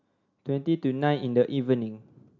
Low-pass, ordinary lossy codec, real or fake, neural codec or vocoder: 7.2 kHz; none; real; none